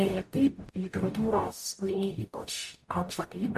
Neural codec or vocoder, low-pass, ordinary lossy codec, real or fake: codec, 44.1 kHz, 0.9 kbps, DAC; 14.4 kHz; MP3, 64 kbps; fake